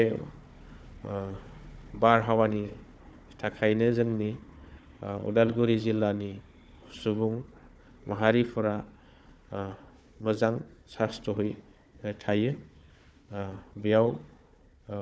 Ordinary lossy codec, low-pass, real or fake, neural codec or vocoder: none; none; fake; codec, 16 kHz, 4 kbps, FunCodec, trained on Chinese and English, 50 frames a second